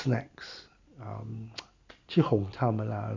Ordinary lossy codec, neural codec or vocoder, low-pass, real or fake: MP3, 64 kbps; none; 7.2 kHz; real